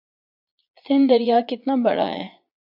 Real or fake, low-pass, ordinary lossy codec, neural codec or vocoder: fake; 5.4 kHz; AAC, 48 kbps; vocoder, 22.05 kHz, 80 mel bands, Vocos